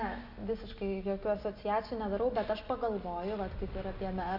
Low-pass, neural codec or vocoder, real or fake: 5.4 kHz; none; real